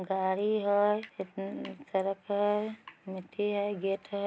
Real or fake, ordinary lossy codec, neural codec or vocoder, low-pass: real; none; none; none